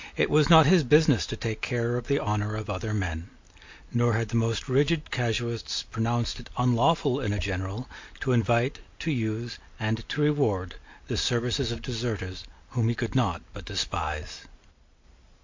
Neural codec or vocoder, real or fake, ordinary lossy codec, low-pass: none; real; MP3, 48 kbps; 7.2 kHz